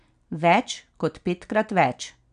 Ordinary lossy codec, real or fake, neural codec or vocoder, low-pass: none; real; none; 9.9 kHz